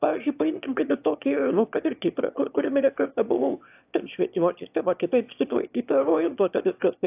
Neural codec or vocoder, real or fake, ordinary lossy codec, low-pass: autoencoder, 22.05 kHz, a latent of 192 numbers a frame, VITS, trained on one speaker; fake; AAC, 32 kbps; 3.6 kHz